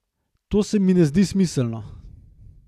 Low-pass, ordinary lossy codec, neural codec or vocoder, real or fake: 14.4 kHz; none; none; real